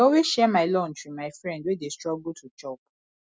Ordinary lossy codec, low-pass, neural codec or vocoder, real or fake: none; none; none; real